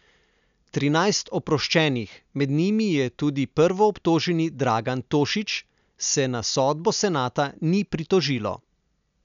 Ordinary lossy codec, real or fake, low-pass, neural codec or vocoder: none; real; 7.2 kHz; none